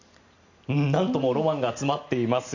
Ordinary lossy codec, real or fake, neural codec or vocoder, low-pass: Opus, 64 kbps; real; none; 7.2 kHz